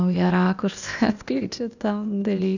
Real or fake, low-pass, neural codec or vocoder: fake; 7.2 kHz; codec, 16 kHz, 0.8 kbps, ZipCodec